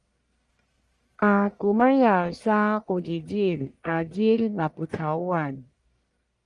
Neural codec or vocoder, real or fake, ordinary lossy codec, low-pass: codec, 44.1 kHz, 1.7 kbps, Pupu-Codec; fake; Opus, 32 kbps; 10.8 kHz